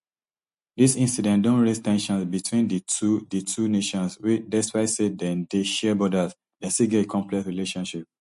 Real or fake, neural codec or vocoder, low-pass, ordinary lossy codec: real; none; 14.4 kHz; MP3, 48 kbps